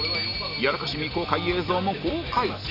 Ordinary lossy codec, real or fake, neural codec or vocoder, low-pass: none; real; none; 5.4 kHz